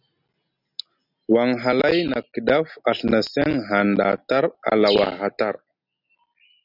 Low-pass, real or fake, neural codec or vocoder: 5.4 kHz; real; none